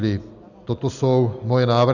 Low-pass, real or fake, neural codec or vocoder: 7.2 kHz; real; none